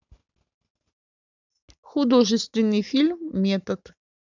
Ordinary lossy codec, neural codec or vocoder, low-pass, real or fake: none; none; 7.2 kHz; real